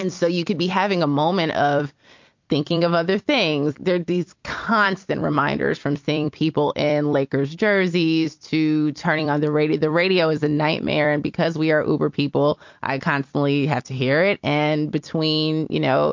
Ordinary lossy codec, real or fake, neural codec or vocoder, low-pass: MP3, 48 kbps; fake; vocoder, 44.1 kHz, 128 mel bands every 256 samples, BigVGAN v2; 7.2 kHz